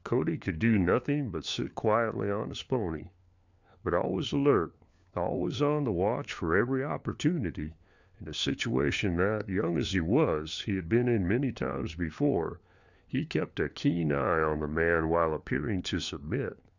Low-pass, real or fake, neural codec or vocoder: 7.2 kHz; fake; codec, 16 kHz, 4 kbps, FunCodec, trained on LibriTTS, 50 frames a second